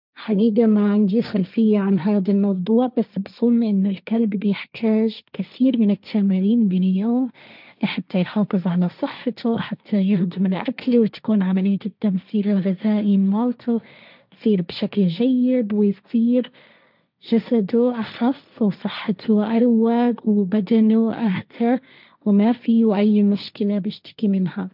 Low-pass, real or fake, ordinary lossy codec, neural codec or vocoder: 5.4 kHz; fake; none; codec, 16 kHz, 1.1 kbps, Voila-Tokenizer